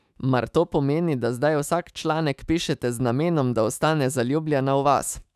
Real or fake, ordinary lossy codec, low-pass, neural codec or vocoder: fake; none; 14.4 kHz; autoencoder, 48 kHz, 128 numbers a frame, DAC-VAE, trained on Japanese speech